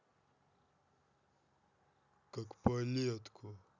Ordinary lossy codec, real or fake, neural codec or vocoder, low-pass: none; real; none; 7.2 kHz